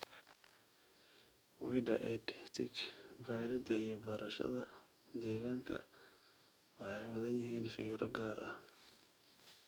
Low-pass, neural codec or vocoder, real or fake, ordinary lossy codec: none; codec, 44.1 kHz, 2.6 kbps, DAC; fake; none